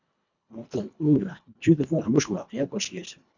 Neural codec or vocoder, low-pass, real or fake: codec, 24 kHz, 1.5 kbps, HILCodec; 7.2 kHz; fake